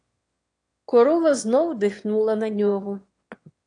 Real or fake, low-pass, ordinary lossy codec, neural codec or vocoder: fake; 9.9 kHz; AAC, 48 kbps; autoencoder, 22.05 kHz, a latent of 192 numbers a frame, VITS, trained on one speaker